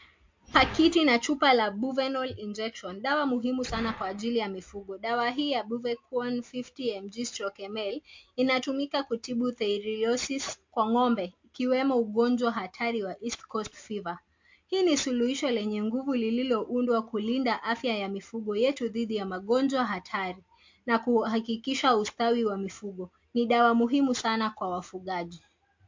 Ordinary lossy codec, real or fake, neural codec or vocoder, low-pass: MP3, 48 kbps; real; none; 7.2 kHz